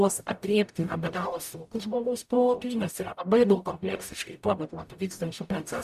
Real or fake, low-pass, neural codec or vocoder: fake; 14.4 kHz; codec, 44.1 kHz, 0.9 kbps, DAC